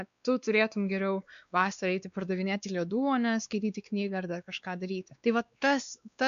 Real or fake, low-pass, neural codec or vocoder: fake; 7.2 kHz; codec, 16 kHz, 2 kbps, X-Codec, WavLM features, trained on Multilingual LibriSpeech